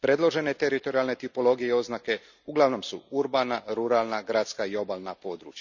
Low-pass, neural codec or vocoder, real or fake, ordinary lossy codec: 7.2 kHz; none; real; none